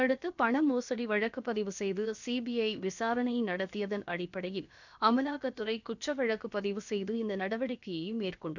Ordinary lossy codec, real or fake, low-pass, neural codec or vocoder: none; fake; 7.2 kHz; codec, 16 kHz, about 1 kbps, DyCAST, with the encoder's durations